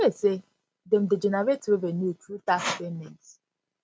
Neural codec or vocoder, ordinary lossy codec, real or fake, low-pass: none; none; real; none